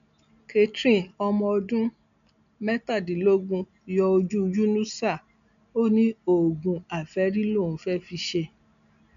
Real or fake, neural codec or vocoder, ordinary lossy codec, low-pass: real; none; none; 7.2 kHz